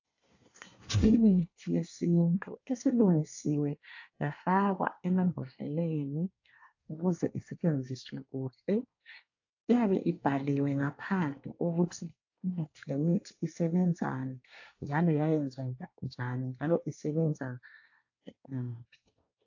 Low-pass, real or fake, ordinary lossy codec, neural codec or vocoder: 7.2 kHz; fake; AAC, 48 kbps; codec, 24 kHz, 1 kbps, SNAC